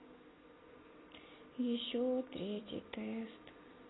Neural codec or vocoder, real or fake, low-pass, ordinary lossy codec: none; real; 7.2 kHz; AAC, 16 kbps